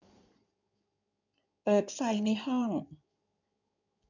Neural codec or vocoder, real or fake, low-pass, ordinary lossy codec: codec, 16 kHz in and 24 kHz out, 2.2 kbps, FireRedTTS-2 codec; fake; 7.2 kHz; none